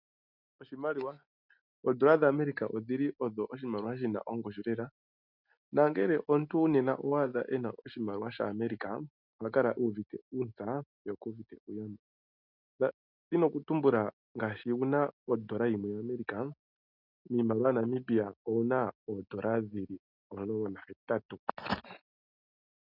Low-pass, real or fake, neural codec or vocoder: 5.4 kHz; real; none